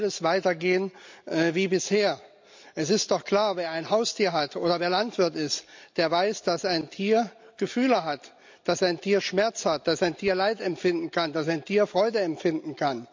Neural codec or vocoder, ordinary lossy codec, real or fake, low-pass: none; MP3, 64 kbps; real; 7.2 kHz